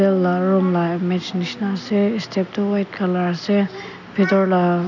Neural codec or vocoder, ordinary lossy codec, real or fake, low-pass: none; none; real; 7.2 kHz